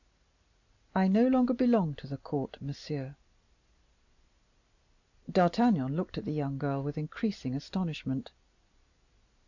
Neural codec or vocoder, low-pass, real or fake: none; 7.2 kHz; real